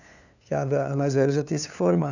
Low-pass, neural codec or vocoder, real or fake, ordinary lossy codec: 7.2 kHz; codec, 16 kHz, 2 kbps, FunCodec, trained on LibriTTS, 25 frames a second; fake; none